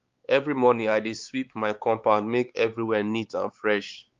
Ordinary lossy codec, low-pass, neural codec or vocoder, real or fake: Opus, 32 kbps; 7.2 kHz; codec, 16 kHz, 4 kbps, X-Codec, WavLM features, trained on Multilingual LibriSpeech; fake